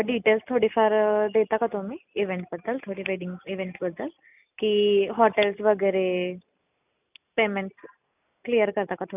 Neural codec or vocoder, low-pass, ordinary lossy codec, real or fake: none; 3.6 kHz; none; real